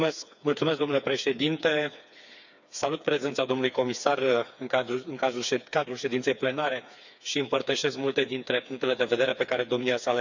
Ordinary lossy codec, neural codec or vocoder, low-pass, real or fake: none; codec, 16 kHz, 4 kbps, FreqCodec, smaller model; 7.2 kHz; fake